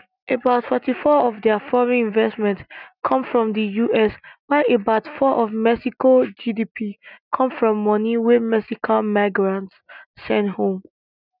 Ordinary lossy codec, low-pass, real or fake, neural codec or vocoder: none; 5.4 kHz; real; none